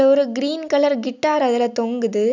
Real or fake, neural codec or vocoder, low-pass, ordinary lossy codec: real; none; 7.2 kHz; none